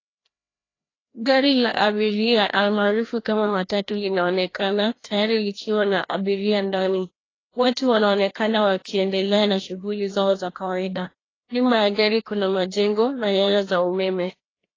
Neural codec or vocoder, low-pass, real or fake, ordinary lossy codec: codec, 16 kHz, 1 kbps, FreqCodec, larger model; 7.2 kHz; fake; AAC, 32 kbps